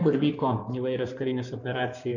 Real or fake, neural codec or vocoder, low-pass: fake; codec, 16 kHz in and 24 kHz out, 2.2 kbps, FireRedTTS-2 codec; 7.2 kHz